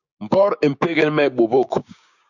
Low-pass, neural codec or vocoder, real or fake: 7.2 kHz; codec, 16 kHz, 6 kbps, DAC; fake